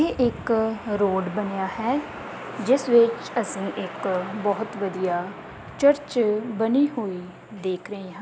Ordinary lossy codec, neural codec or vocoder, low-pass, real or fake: none; none; none; real